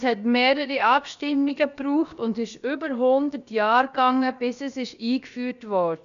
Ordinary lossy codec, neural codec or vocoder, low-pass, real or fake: none; codec, 16 kHz, about 1 kbps, DyCAST, with the encoder's durations; 7.2 kHz; fake